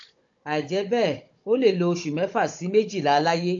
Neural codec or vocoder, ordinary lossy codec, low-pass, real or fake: codec, 16 kHz, 16 kbps, FunCodec, trained on Chinese and English, 50 frames a second; AAC, 48 kbps; 7.2 kHz; fake